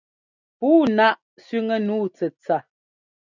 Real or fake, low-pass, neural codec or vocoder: real; 7.2 kHz; none